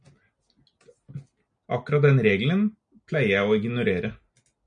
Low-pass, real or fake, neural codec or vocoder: 9.9 kHz; real; none